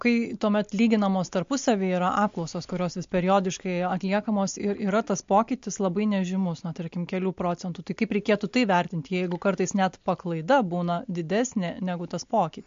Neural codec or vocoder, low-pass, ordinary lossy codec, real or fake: none; 7.2 kHz; MP3, 48 kbps; real